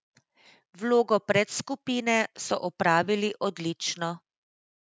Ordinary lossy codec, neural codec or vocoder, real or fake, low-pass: none; none; real; none